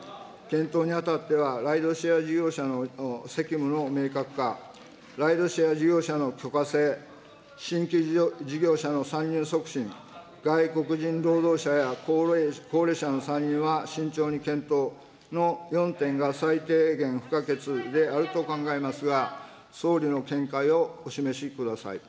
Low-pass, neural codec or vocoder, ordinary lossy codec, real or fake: none; none; none; real